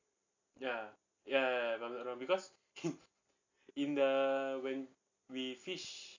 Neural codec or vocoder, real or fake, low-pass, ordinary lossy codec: none; real; 7.2 kHz; AAC, 48 kbps